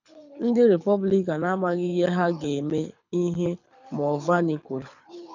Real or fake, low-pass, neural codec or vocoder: fake; 7.2 kHz; codec, 24 kHz, 6 kbps, HILCodec